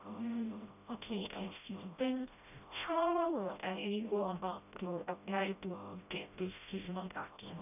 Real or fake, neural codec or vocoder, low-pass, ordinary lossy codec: fake; codec, 16 kHz, 0.5 kbps, FreqCodec, smaller model; 3.6 kHz; none